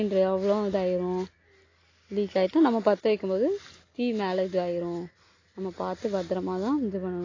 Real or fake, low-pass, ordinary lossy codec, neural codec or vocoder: real; 7.2 kHz; AAC, 32 kbps; none